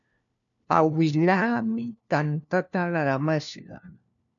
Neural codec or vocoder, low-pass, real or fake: codec, 16 kHz, 1 kbps, FunCodec, trained on LibriTTS, 50 frames a second; 7.2 kHz; fake